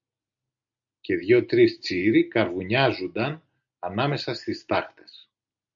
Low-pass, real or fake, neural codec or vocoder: 7.2 kHz; real; none